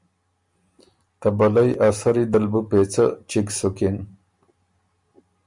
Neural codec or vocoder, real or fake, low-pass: none; real; 10.8 kHz